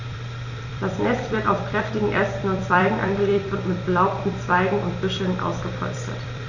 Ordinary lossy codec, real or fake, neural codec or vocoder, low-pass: none; fake; vocoder, 44.1 kHz, 128 mel bands every 256 samples, BigVGAN v2; 7.2 kHz